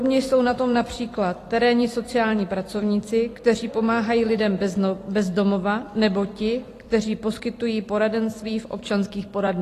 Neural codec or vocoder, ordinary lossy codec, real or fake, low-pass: vocoder, 44.1 kHz, 128 mel bands every 256 samples, BigVGAN v2; AAC, 48 kbps; fake; 14.4 kHz